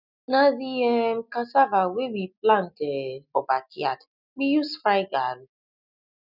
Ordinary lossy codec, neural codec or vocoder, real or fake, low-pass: none; none; real; 5.4 kHz